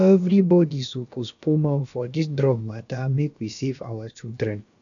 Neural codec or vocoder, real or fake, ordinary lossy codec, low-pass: codec, 16 kHz, about 1 kbps, DyCAST, with the encoder's durations; fake; none; 7.2 kHz